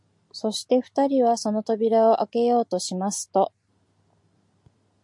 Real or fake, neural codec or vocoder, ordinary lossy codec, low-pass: real; none; MP3, 64 kbps; 10.8 kHz